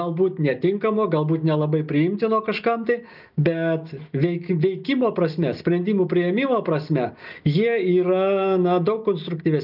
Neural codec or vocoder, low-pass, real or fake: none; 5.4 kHz; real